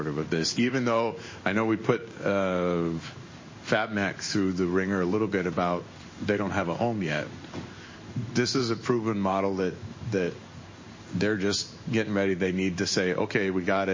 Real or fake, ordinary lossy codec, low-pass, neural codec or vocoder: fake; MP3, 32 kbps; 7.2 kHz; codec, 16 kHz in and 24 kHz out, 1 kbps, XY-Tokenizer